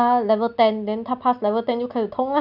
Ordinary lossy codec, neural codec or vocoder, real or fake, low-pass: none; none; real; 5.4 kHz